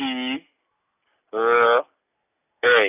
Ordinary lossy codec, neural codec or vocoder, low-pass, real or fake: none; none; 3.6 kHz; real